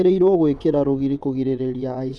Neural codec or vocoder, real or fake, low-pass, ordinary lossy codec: vocoder, 22.05 kHz, 80 mel bands, WaveNeXt; fake; none; none